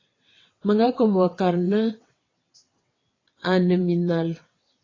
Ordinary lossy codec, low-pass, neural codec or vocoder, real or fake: AAC, 32 kbps; 7.2 kHz; vocoder, 22.05 kHz, 80 mel bands, WaveNeXt; fake